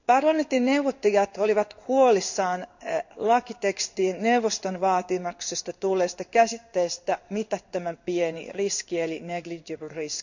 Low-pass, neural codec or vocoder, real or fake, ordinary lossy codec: 7.2 kHz; codec, 16 kHz in and 24 kHz out, 1 kbps, XY-Tokenizer; fake; none